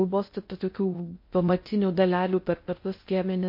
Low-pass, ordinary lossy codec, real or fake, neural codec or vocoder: 5.4 kHz; MP3, 32 kbps; fake; codec, 16 kHz in and 24 kHz out, 0.6 kbps, FocalCodec, streaming, 2048 codes